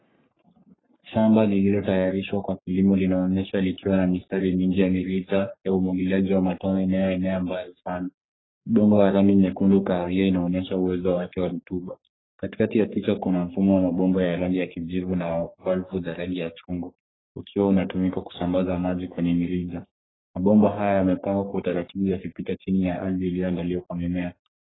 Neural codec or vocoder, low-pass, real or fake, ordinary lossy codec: codec, 44.1 kHz, 3.4 kbps, Pupu-Codec; 7.2 kHz; fake; AAC, 16 kbps